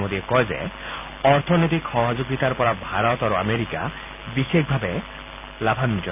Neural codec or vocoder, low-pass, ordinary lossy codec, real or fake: none; 3.6 kHz; none; real